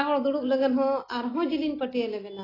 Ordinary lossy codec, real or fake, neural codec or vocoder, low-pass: AAC, 24 kbps; real; none; 5.4 kHz